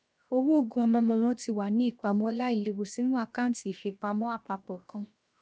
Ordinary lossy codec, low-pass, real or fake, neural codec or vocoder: none; none; fake; codec, 16 kHz, 0.7 kbps, FocalCodec